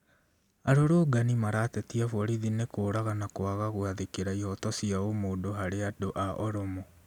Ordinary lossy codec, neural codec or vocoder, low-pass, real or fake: none; none; 19.8 kHz; real